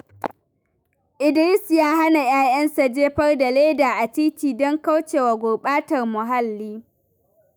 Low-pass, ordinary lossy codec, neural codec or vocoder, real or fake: none; none; autoencoder, 48 kHz, 128 numbers a frame, DAC-VAE, trained on Japanese speech; fake